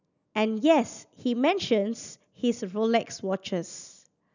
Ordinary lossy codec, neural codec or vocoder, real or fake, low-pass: none; none; real; 7.2 kHz